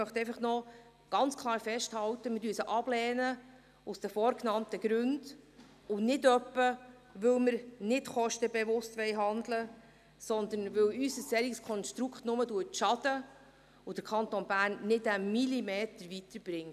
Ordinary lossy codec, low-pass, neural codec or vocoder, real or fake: none; 14.4 kHz; none; real